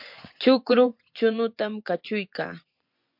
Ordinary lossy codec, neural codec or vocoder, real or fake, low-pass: MP3, 48 kbps; vocoder, 24 kHz, 100 mel bands, Vocos; fake; 5.4 kHz